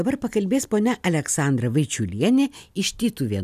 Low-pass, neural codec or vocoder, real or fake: 14.4 kHz; none; real